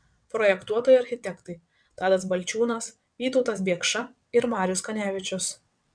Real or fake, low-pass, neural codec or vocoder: fake; 9.9 kHz; vocoder, 22.05 kHz, 80 mel bands, WaveNeXt